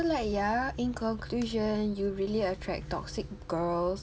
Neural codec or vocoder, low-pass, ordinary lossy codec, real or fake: none; none; none; real